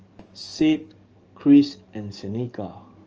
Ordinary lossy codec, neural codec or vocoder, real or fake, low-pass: Opus, 24 kbps; codec, 44.1 kHz, 7.8 kbps, DAC; fake; 7.2 kHz